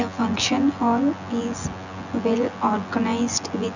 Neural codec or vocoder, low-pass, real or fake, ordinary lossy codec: vocoder, 24 kHz, 100 mel bands, Vocos; 7.2 kHz; fake; none